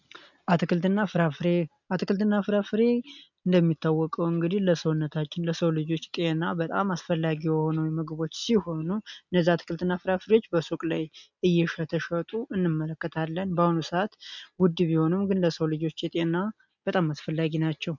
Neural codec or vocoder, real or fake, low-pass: none; real; 7.2 kHz